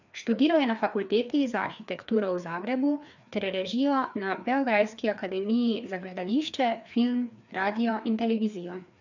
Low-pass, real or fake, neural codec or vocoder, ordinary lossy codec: 7.2 kHz; fake; codec, 16 kHz, 2 kbps, FreqCodec, larger model; none